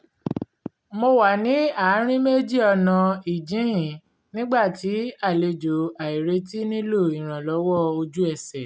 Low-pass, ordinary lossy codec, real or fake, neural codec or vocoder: none; none; real; none